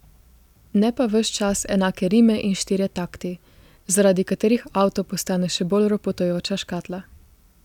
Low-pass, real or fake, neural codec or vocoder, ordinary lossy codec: 19.8 kHz; real; none; none